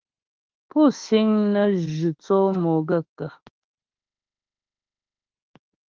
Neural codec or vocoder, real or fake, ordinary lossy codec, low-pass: autoencoder, 48 kHz, 32 numbers a frame, DAC-VAE, trained on Japanese speech; fake; Opus, 16 kbps; 7.2 kHz